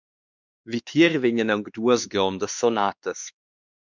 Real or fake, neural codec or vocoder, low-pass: fake; codec, 16 kHz, 2 kbps, X-Codec, WavLM features, trained on Multilingual LibriSpeech; 7.2 kHz